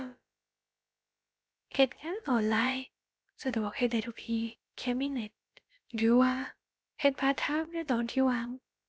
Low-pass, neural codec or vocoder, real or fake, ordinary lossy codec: none; codec, 16 kHz, about 1 kbps, DyCAST, with the encoder's durations; fake; none